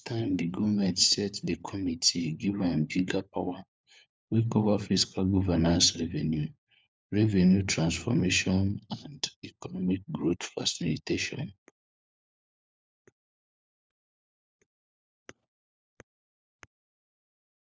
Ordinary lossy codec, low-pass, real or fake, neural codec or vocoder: none; none; fake; codec, 16 kHz, 4 kbps, FunCodec, trained on LibriTTS, 50 frames a second